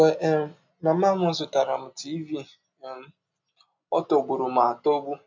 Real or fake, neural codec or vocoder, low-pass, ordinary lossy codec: real; none; 7.2 kHz; MP3, 64 kbps